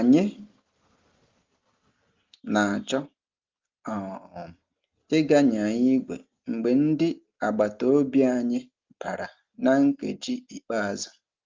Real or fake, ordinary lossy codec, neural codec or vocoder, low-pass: real; Opus, 32 kbps; none; 7.2 kHz